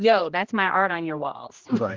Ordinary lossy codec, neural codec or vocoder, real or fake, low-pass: Opus, 32 kbps; codec, 16 kHz, 1 kbps, X-Codec, HuBERT features, trained on general audio; fake; 7.2 kHz